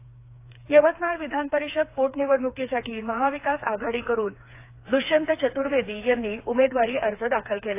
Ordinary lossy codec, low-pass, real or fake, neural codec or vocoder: AAC, 24 kbps; 3.6 kHz; fake; codec, 16 kHz, 4 kbps, FreqCodec, smaller model